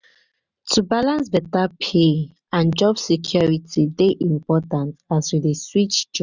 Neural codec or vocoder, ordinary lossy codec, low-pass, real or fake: none; none; 7.2 kHz; real